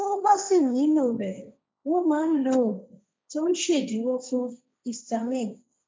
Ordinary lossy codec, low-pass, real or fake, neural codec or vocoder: none; none; fake; codec, 16 kHz, 1.1 kbps, Voila-Tokenizer